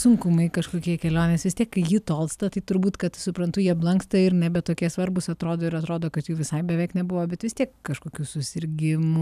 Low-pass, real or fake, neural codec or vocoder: 14.4 kHz; real; none